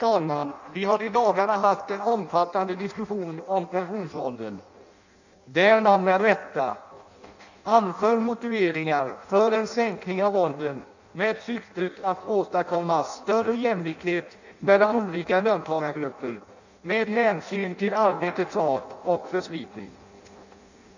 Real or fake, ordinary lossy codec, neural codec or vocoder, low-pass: fake; none; codec, 16 kHz in and 24 kHz out, 0.6 kbps, FireRedTTS-2 codec; 7.2 kHz